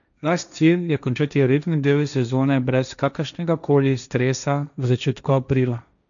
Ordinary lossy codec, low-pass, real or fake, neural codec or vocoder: none; 7.2 kHz; fake; codec, 16 kHz, 1.1 kbps, Voila-Tokenizer